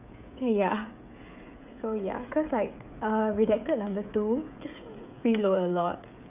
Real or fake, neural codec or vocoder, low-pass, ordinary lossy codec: fake; codec, 16 kHz, 16 kbps, FreqCodec, smaller model; 3.6 kHz; none